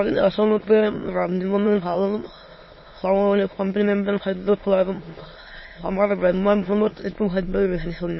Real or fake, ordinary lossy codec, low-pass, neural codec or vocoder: fake; MP3, 24 kbps; 7.2 kHz; autoencoder, 22.05 kHz, a latent of 192 numbers a frame, VITS, trained on many speakers